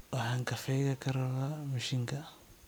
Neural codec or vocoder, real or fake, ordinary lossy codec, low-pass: none; real; none; none